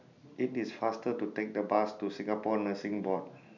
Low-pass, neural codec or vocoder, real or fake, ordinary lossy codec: 7.2 kHz; none; real; none